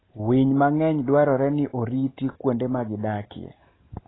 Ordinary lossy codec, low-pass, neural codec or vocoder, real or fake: AAC, 16 kbps; 7.2 kHz; none; real